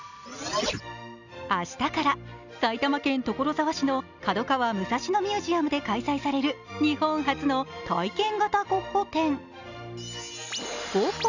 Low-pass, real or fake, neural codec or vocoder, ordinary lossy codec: 7.2 kHz; real; none; none